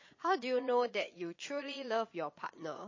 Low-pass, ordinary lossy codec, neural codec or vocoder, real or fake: 7.2 kHz; MP3, 32 kbps; vocoder, 22.05 kHz, 80 mel bands, Vocos; fake